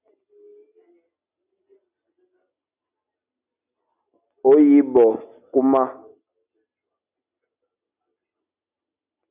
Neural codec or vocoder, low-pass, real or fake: none; 3.6 kHz; real